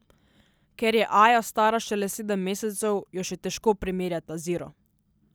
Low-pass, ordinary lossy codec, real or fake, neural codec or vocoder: none; none; real; none